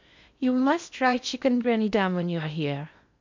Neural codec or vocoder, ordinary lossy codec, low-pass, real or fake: codec, 16 kHz in and 24 kHz out, 0.6 kbps, FocalCodec, streaming, 4096 codes; MP3, 64 kbps; 7.2 kHz; fake